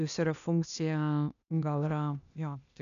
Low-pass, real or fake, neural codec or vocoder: 7.2 kHz; fake; codec, 16 kHz, 0.8 kbps, ZipCodec